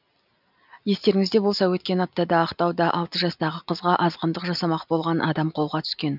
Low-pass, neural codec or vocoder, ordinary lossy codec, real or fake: 5.4 kHz; none; none; real